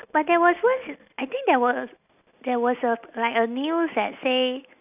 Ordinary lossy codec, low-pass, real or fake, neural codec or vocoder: none; 3.6 kHz; real; none